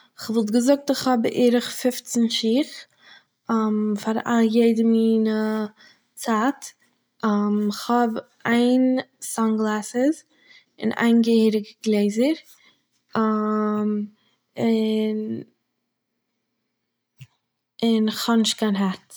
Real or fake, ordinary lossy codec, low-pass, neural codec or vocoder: real; none; none; none